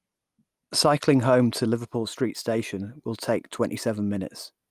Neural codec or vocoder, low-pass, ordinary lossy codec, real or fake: none; 14.4 kHz; Opus, 32 kbps; real